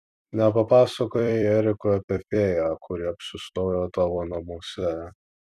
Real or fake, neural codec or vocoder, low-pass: fake; vocoder, 44.1 kHz, 128 mel bands every 256 samples, BigVGAN v2; 14.4 kHz